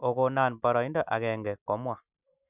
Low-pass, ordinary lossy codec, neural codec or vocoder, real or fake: 3.6 kHz; none; none; real